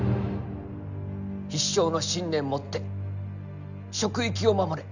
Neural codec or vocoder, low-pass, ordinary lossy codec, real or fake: none; 7.2 kHz; none; real